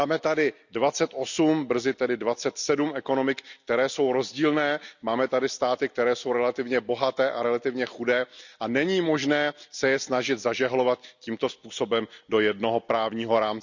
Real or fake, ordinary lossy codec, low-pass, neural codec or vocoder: real; none; 7.2 kHz; none